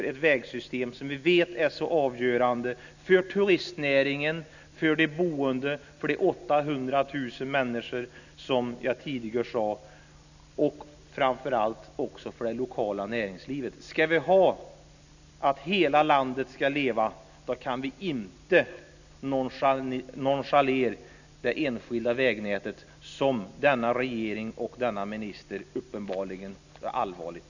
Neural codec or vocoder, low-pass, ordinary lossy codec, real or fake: none; 7.2 kHz; none; real